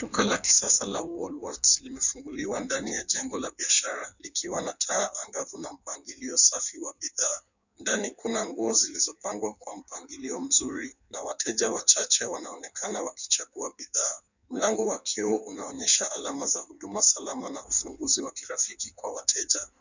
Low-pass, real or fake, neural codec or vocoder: 7.2 kHz; fake; codec, 16 kHz in and 24 kHz out, 1.1 kbps, FireRedTTS-2 codec